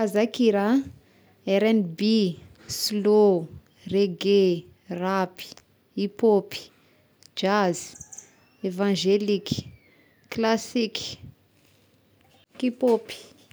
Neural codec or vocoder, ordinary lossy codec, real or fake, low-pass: none; none; real; none